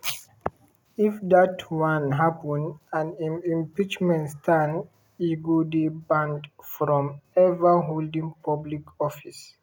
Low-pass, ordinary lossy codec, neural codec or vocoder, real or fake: 19.8 kHz; none; none; real